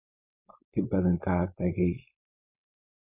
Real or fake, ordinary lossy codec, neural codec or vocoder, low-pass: fake; none; codec, 16 kHz, 4.8 kbps, FACodec; 3.6 kHz